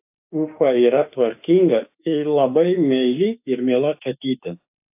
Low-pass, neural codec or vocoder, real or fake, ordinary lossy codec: 3.6 kHz; autoencoder, 48 kHz, 32 numbers a frame, DAC-VAE, trained on Japanese speech; fake; AAC, 32 kbps